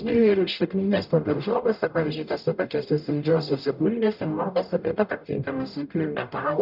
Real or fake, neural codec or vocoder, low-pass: fake; codec, 44.1 kHz, 0.9 kbps, DAC; 5.4 kHz